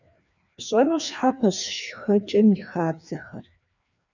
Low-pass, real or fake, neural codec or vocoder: 7.2 kHz; fake; codec, 16 kHz, 2 kbps, FreqCodec, larger model